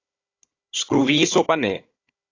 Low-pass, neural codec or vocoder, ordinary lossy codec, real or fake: 7.2 kHz; codec, 16 kHz, 16 kbps, FunCodec, trained on Chinese and English, 50 frames a second; AAC, 32 kbps; fake